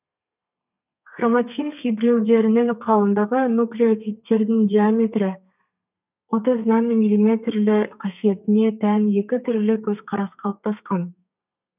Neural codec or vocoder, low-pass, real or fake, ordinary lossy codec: codec, 32 kHz, 1.9 kbps, SNAC; 3.6 kHz; fake; none